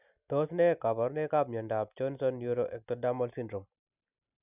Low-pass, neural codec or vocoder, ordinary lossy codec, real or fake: 3.6 kHz; none; none; real